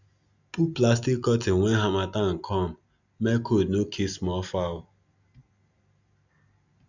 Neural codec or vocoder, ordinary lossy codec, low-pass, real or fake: none; none; 7.2 kHz; real